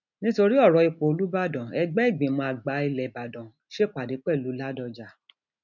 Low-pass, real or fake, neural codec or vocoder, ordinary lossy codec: 7.2 kHz; real; none; none